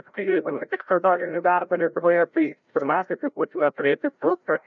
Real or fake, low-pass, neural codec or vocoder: fake; 7.2 kHz; codec, 16 kHz, 0.5 kbps, FreqCodec, larger model